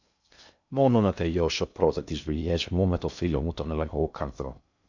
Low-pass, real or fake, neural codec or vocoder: 7.2 kHz; fake; codec, 16 kHz in and 24 kHz out, 0.6 kbps, FocalCodec, streaming, 2048 codes